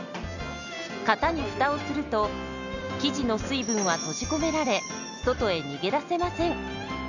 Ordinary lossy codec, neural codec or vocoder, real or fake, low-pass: none; none; real; 7.2 kHz